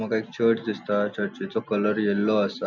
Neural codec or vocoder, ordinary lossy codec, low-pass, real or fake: none; none; 7.2 kHz; real